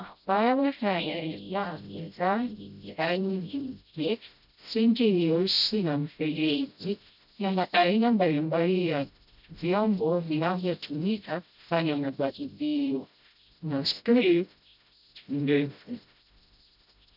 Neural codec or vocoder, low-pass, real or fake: codec, 16 kHz, 0.5 kbps, FreqCodec, smaller model; 5.4 kHz; fake